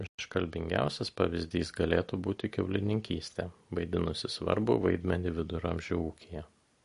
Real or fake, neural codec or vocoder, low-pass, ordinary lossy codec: real; none; 10.8 kHz; MP3, 48 kbps